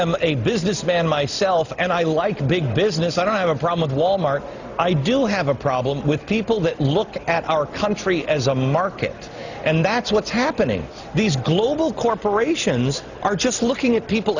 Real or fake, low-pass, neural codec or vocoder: real; 7.2 kHz; none